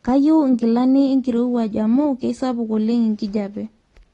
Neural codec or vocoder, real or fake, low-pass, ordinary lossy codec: none; real; 10.8 kHz; AAC, 32 kbps